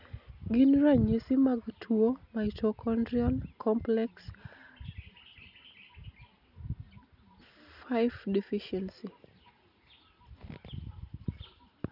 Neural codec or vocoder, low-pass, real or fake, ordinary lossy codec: none; 5.4 kHz; real; none